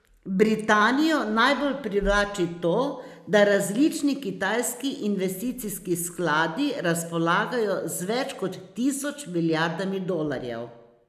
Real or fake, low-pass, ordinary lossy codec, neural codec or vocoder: real; 14.4 kHz; none; none